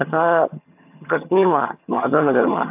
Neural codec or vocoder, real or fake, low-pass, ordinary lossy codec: vocoder, 22.05 kHz, 80 mel bands, HiFi-GAN; fake; 3.6 kHz; AAC, 32 kbps